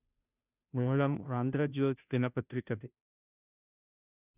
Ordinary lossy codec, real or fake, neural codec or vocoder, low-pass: none; fake; codec, 16 kHz, 0.5 kbps, FunCodec, trained on Chinese and English, 25 frames a second; 3.6 kHz